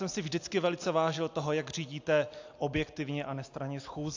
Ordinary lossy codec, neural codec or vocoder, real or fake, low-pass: AAC, 48 kbps; none; real; 7.2 kHz